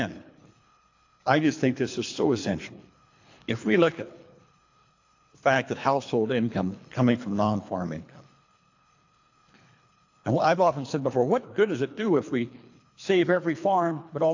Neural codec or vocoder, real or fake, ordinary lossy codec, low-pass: codec, 24 kHz, 3 kbps, HILCodec; fake; AAC, 48 kbps; 7.2 kHz